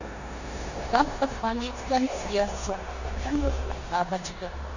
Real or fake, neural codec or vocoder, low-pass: fake; codec, 16 kHz in and 24 kHz out, 0.9 kbps, LongCat-Audio-Codec, four codebook decoder; 7.2 kHz